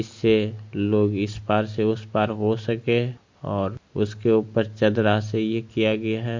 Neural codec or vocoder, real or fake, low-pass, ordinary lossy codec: none; real; 7.2 kHz; MP3, 48 kbps